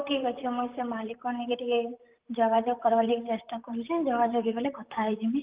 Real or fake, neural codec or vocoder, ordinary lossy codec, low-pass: fake; vocoder, 44.1 kHz, 128 mel bands, Pupu-Vocoder; Opus, 32 kbps; 3.6 kHz